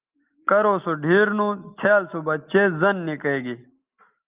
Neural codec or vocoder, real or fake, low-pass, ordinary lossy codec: none; real; 3.6 kHz; Opus, 32 kbps